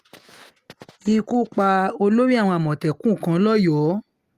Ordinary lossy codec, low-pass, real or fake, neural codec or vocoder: Opus, 32 kbps; 14.4 kHz; real; none